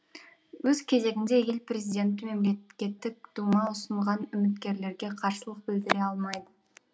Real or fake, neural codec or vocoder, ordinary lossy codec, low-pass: real; none; none; none